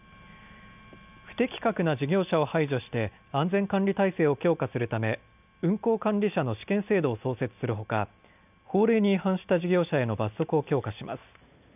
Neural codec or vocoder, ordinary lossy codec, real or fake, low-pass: none; none; real; 3.6 kHz